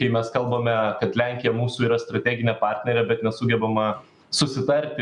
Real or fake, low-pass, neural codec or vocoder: real; 10.8 kHz; none